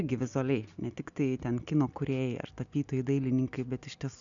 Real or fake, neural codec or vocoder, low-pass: real; none; 7.2 kHz